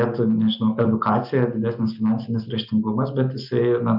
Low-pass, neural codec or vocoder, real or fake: 5.4 kHz; none; real